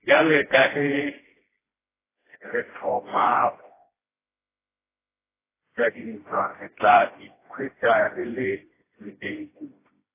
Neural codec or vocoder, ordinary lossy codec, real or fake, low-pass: codec, 16 kHz, 1 kbps, FreqCodec, smaller model; AAC, 16 kbps; fake; 3.6 kHz